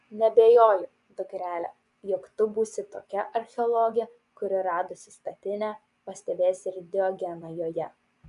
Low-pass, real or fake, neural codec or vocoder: 10.8 kHz; fake; vocoder, 24 kHz, 100 mel bands, Vocos